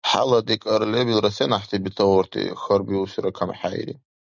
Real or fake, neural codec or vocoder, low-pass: real; none; 7.2 kHz